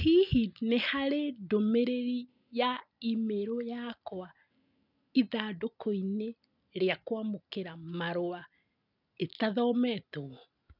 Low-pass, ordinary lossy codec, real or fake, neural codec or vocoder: 5.4 kHz; none; real; none